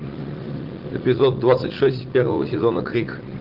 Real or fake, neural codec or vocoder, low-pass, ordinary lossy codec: fake; vocoder, 44.1 kHz, 80 mel bands, Vocos; 5.4 kHz; Opus, 16 kbps